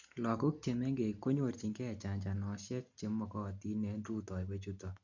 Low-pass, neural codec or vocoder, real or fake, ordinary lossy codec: 7.2 kHz; none; real; none